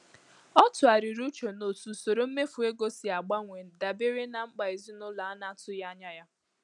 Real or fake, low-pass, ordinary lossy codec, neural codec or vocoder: real; 10.8 kHz; none; none